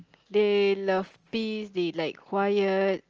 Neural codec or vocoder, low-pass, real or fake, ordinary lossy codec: none; 7.2 kHz; real; Opus, 24 kbps